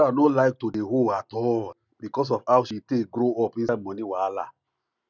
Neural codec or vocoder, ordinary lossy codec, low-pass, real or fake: none; none; 7.2 kHz; real